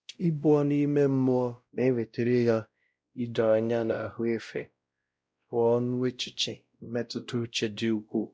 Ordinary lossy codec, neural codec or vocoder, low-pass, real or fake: none; codec, 16 kHz, 0.5 kbps, X-Codec, WavLM features, trained on Multilingual LibriSpeech; none; fake